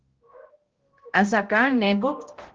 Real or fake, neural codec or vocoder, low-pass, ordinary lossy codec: fake; codec, 16 kHz, 0.5 kbps, X-Codec, HuBERT features, trained on balanced general audio; 7.2 kHz; Opus, 16 kbps